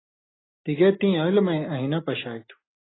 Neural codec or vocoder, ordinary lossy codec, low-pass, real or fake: none; AAC, 16 kbps; 7.2 kHz; real